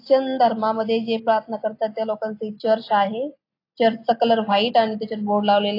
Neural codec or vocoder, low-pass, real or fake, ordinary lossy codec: none; 5.4 kHz; real; AAC, 32 kbps